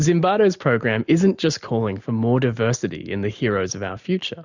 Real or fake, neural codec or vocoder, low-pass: real; none; 7.2 kHz